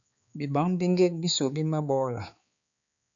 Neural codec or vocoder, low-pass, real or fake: codec, 16 kHz, 4 kbps, X-Codec, HuBERT features, trained on balanced general audio; 7.2 kHz; fake